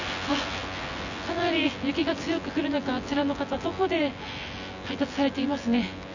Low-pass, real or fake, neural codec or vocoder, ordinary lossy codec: 7.2 kHz; fake; vocoder, 24 kHz, 100 mel bands, Vocos; none